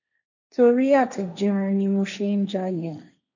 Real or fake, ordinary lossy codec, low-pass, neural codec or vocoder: fake; none; 7.2 kHz; codec, 16 kHz, 1.1 kbps, Voila-Tokenizer